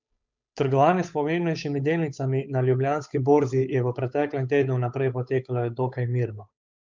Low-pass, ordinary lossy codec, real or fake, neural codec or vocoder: 7.2 kHz; MP3, 64 kbps; fake; codec, 16 kHz, 8 kbps, FunCodec, trained on Chinese and English, 25 frames a second